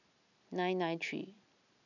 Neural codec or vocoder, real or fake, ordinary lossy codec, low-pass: none; real; none; 7.2 kHz